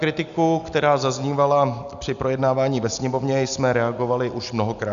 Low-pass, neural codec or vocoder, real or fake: 7.2 kHz; none; real